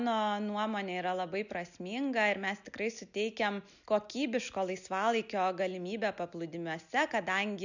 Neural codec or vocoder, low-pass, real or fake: none; 7.2 kHz; real